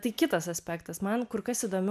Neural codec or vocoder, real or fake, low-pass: none; real; 14.4 kHz